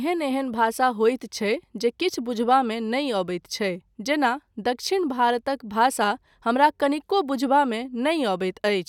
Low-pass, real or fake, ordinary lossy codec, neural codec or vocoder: 19.8 kHz; real; none; none